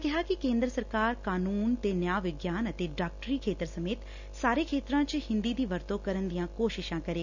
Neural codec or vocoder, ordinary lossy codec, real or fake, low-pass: none; none; real; 7.2 kHz